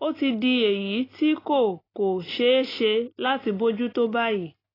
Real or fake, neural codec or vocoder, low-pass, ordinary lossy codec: real; none; 5.4 kHz; AAC, 24 kbps